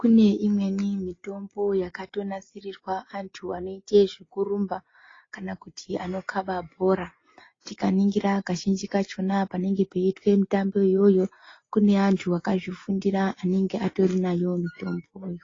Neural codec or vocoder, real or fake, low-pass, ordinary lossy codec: none; real; 7.2 kHz; AAC, 32 kbps